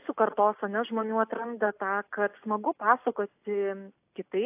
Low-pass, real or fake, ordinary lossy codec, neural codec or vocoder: 3.6 kHz; real; AAC, 32 kbps; none